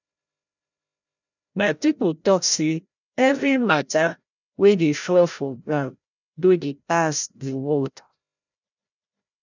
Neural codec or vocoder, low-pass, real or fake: codec, 16 kHz, 0.5 kbps, FreqCodec, larger model; 7.2 kHz; fake